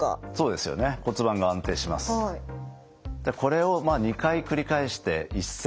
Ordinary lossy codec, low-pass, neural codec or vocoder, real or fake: none; none; none; real